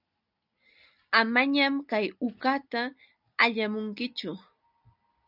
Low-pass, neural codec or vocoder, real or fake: 5.4 kHz; none; real